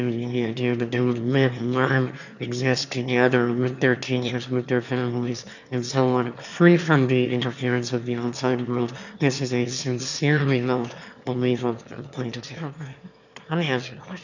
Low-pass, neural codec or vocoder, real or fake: 7.2 kHz; autoencoder, 22.05 kHz, a latent of 192 numbers a frame, VITS, trained on one speaker; fake